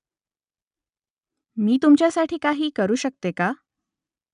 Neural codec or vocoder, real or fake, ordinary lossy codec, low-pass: none; real; none; 10.8 kHz